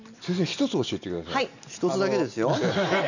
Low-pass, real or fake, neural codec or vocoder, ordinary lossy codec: 7.2 kHz; real; none; none